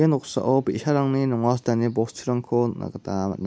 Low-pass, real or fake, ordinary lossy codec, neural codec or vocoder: none; real; none; none